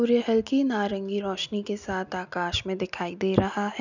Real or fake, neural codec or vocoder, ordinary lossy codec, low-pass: real; none; none; 7.2 kHz